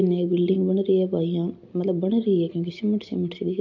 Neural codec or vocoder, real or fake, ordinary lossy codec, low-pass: none; real; AAC, 48 kbps; 7.2 kHz